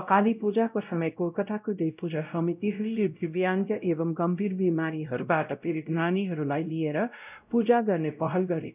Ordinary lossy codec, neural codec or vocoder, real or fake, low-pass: none; codec, 16 kHz, 0.5 kbps, X-Codec, WavLM features, trained on Multilingual LibriSpeech; fake; 3.6 kHz